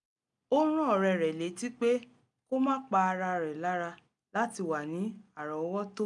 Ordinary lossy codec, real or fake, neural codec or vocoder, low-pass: none; real; none; 10.8 kHz